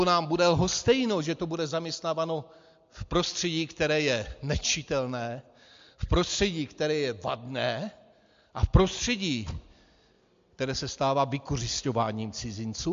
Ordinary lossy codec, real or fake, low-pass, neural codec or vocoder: MP3, 48 kbps; real; 7.2 kHz; none